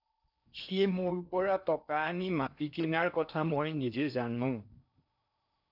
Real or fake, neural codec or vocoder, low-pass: fake; codec, 16 kHz in and 24 kHz out, 0.8 kbps, FocalCodec, streaming, 65536 codes; 5.4 kHz